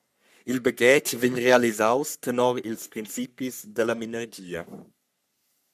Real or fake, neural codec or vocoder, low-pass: fake; codec, 44.1 kHz, 3.4 kbps, Pupu-Codec; 14.4 kHz